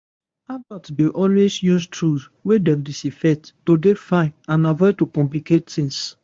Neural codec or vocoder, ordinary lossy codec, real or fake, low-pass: codec, 24 kHz, 0.9 kbps, WavTokenizer, medium speech release version 1; MP3, 64 kbps; fake; 9.9 kHz